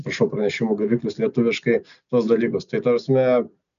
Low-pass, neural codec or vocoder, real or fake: 7.2 kHz; none; real